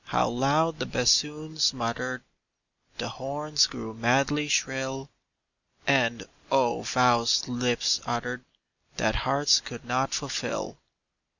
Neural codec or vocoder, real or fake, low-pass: none; real; 7.2 kHz